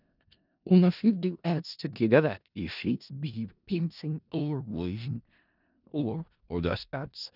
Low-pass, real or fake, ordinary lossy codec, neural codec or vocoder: 5.4 kHz; fake; none; codec, 16 kHz in and 24 kHz out, 0.4 kbps, LongCat-Audio-Codec, four codebook decoder